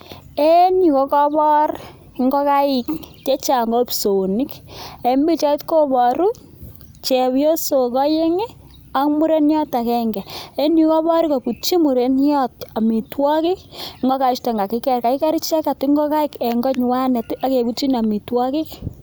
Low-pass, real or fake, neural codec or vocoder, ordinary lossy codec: none; real; none; none